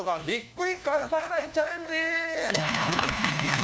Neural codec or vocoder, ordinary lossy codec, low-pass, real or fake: codec, 16 kHz, 1 kbps, FunCodec, trained on LibriTTS, 50 frames a second; none; none; fake